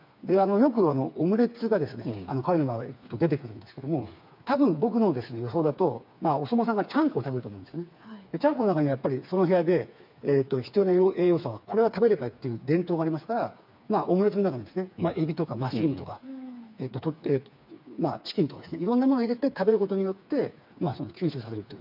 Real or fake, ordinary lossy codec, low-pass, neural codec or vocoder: fake; MP3, 48 kbps; 5.4 kHz; codec, 16 kHz, 4 kbps, FreqCodec, smaller model